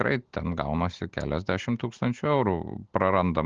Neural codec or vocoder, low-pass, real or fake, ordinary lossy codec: none; 7.2 kHz; real; Opus, 16 kbps